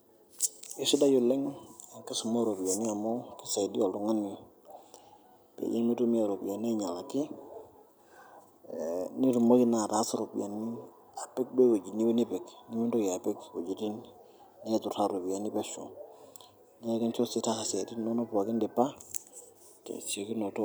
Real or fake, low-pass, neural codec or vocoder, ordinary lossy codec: real; none; none; none